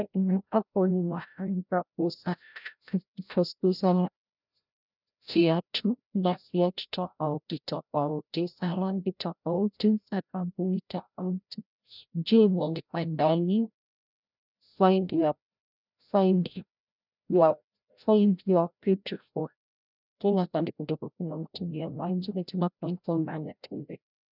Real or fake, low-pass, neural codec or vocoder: fake; 5.4 kHz; codec, 16 kHz, 0.5 kbps, FreqCodec, larger model